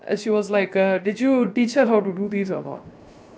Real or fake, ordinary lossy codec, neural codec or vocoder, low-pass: fake; none; codec, 16 kHz, 0.7 kbps, FocalCodec; none